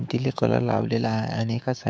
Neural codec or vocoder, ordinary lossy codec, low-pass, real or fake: codec, 16 kHz, 6 kbps, DAC; none; none; fake